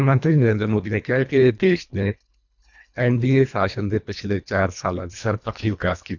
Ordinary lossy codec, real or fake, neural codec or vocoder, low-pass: none; fake; codec, 24 kHz, 1.5 kbps, HILCodec; 7.2 kHz